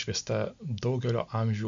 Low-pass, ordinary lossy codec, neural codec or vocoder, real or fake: 7.2 kHz; MP3, 64 kbps; none; real